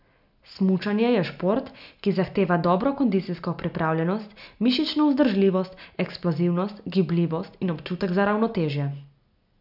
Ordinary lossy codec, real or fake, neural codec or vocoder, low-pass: none; real; none; 5.4 kHz